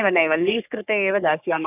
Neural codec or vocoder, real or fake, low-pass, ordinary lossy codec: codec, 44.1 kHz, 3.4 kbps, Pupu-Codec; fake; 3.6 kHz; none